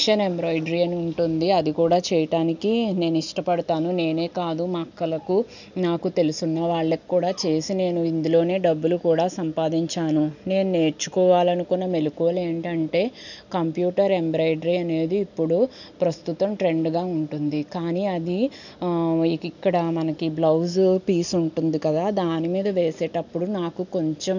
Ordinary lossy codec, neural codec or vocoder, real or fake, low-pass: none; none; real; 7.2 kHz